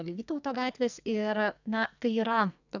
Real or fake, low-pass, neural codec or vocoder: fake; 7.2 kHz; codec, 44.1 kHz, 2.6 kbps, SNAC